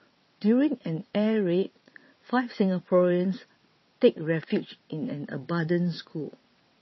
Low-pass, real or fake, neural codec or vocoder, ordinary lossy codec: 7.2 kHz; fake; vocoder, 22.05 kHz, 80 mel bands, WaveNeXt; MP3, 24 kbps